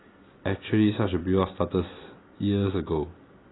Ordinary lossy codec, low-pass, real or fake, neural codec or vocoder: AAC, 16 kbps; 7.2 kHz; real; none